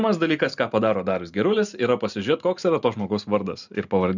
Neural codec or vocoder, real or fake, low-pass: none; real; 7.2 kHz